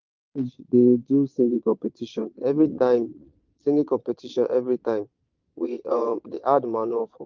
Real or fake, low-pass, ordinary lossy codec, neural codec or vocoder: fake; 7.2 kHz; Opus, 32 kbps; vocoder, 22.05 kHz, 80 mel bands, Vocos